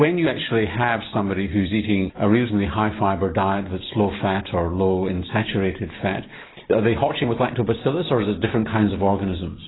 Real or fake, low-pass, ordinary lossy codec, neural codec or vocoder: real; 7.2 kHz; AAC, 16 kbps; none